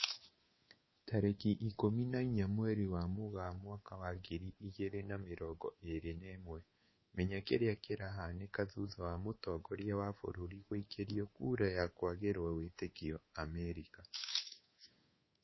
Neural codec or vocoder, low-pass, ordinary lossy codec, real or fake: codec, 24 kHz, 3.1 kbps, DualCodec; 7.2 kHz; MP3, 24 kbps; fake